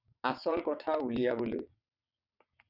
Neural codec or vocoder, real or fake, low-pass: vocoder, 22.05 kHz, 80 mel bands, WaveNeXt; fake; 5.4 kHz